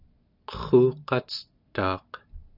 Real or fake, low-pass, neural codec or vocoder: real; 5.4 kHz; none